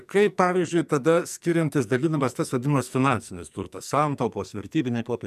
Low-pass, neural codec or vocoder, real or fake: 14.4 kHz; codec, 44.1 kHz, 2.6 kbps, SNAC; fake